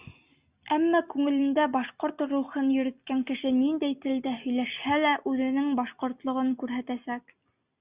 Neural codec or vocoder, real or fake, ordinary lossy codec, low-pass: none; real; Opus, 64 kbps; 3.6 kHz